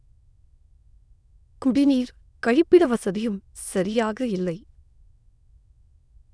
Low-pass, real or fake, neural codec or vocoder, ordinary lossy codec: none; fake; autoencoder, 22.05 kHz, a latent of 192 numbers a frame, VITS, trained on many speakers; none